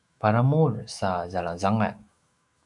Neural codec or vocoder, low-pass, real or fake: autoencoder, 48 kHz, 128 numbers a frame, DAC-VAE, trained on Japanese speech; 10.8 kHz; fake